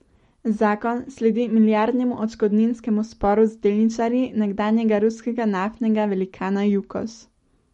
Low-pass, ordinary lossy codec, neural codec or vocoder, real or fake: 19.8 kHz; MP3, 48 kbps; none; real